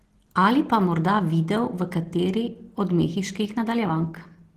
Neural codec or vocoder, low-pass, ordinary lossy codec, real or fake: none; 14.4 kHz; Opus, 16 kbps; real